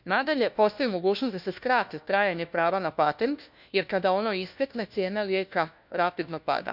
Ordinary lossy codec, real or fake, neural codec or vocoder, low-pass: none; fake; codec, 16 kHz, 1 kbps, FunCodec, trained on LibriTTS, 50 frames a second; 5.4 kHz